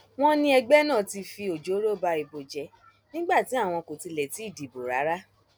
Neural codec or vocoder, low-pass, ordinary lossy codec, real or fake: none; none; none; real